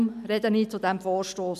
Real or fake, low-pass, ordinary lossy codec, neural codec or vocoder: real; 14.4 kHz; none; none